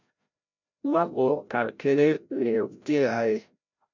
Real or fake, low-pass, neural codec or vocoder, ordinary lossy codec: fake; 7.2 kHz; codec, 16 kHz, 0.5 kbps, FreqCodec, larger model; MP3, 64 kbps